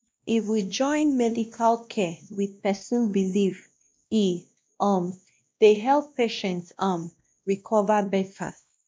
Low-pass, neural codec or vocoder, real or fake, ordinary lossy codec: none; codec, 16 kHz, 1 kbps, X-Codec, WavLM features, trained on Multilingual LibriSpeech; fake; none